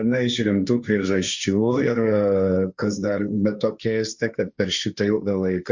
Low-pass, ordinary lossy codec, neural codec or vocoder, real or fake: 7.2 kHz; Opus, 64 kbps; codec, 16 kHz, 1.1 kbps, Voila-Tokenizer; fake